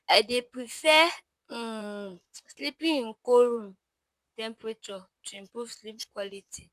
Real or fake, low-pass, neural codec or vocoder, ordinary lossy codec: fake; 14.4 kHz; vocoder, 44.1 kHz, 128 mel bands, Pupu-Vocoder; none